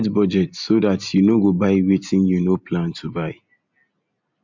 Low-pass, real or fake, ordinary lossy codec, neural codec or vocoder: 7.2 kHz; real; MP3, 64 kbps; none